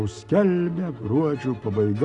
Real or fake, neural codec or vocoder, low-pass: real; none; 10.8 kHz